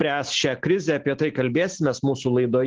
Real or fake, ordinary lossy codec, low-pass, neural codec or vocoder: real; Opus, 16 kbps; 7.2 kHz; none